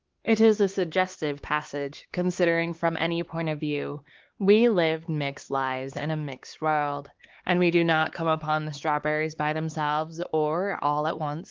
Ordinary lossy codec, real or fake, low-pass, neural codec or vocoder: Opus, 16 kbps; fake; 7.2 kHz; codec, 16 kHz, 4 kbps, X-Codec, HuBERT features, trained on LibriSpeech